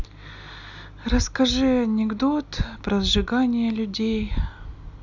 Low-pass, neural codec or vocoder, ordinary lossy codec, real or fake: 7.2 kHz; none; none; real